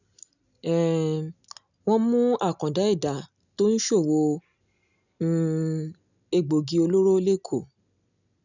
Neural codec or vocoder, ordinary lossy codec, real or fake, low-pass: none; none; real; 7.2 kHz